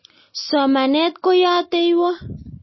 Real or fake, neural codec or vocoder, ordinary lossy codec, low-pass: real; none; MP3, 24 kbps; 7.2 kHz